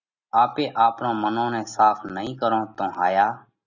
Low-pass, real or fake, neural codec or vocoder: 7.2 kHz; real; none